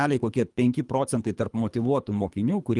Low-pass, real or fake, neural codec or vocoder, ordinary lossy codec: 10.8 kHz; fake; codec, 24 kHz, 3 kbps, HILCodec; Opus, 32 kbps